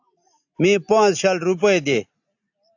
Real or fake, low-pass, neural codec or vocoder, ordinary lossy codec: real; 7.2 kHz; none; AAC, 48 kbps